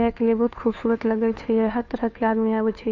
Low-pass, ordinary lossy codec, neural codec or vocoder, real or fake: 7.2 kHz; none; codec, 16 kHz, 2 kbps, FunCodec, trained on Chinese and English, 25 frames a second; fake